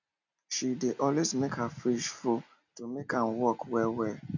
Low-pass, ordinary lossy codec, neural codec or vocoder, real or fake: 7.2 kHz; none; none; real